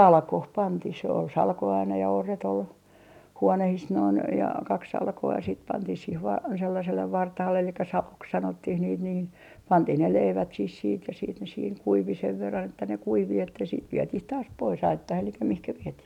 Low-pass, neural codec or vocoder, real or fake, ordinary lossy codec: 19.8 kHz; none; real; none